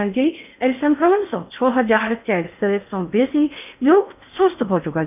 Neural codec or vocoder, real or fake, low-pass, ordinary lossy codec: codec, 16 kHz in and 24 kHz out, 0.6 kbps, FocalCodec, streaming, 4096 codes; fake; 3.6 kHz; none